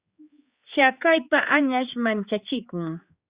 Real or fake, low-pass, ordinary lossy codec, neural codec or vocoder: fake; 3.6 kHz; Opus, 64 kbps; codec, 16 kHz, 4 kbps, X-Codec, HuBERT features, trained on general audio